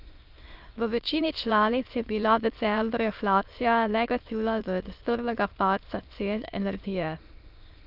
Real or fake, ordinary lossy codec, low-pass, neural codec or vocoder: fake; Opus, 32 kbps; 5.4 kHz; autoencoder, 22.05 kHz, a latent of 192 numbers a frame, VITS, trained on many speakers